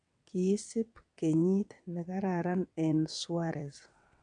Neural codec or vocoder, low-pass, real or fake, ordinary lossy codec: vocoder, 22.05 kHz, 80 mel bands, WaveNeXt; 9.9 kHz; fake; none